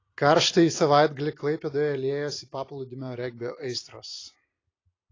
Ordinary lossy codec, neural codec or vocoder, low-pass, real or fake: AAC, 32 kbps; vocoder, 44.1 kHz, 128 mel bands every 256 samples, BigVGAN v2; 7.2 kHz; fake